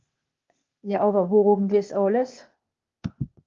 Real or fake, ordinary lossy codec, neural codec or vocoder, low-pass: fake; Opus, 32 kbps; codec, 16 kHz, 0.8 kbps, ZipCodec; 7.2 kHz